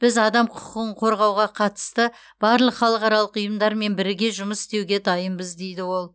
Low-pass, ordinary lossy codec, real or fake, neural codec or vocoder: none; none; real; none